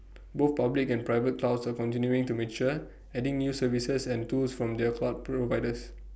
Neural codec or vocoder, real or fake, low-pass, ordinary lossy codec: none; real; none; none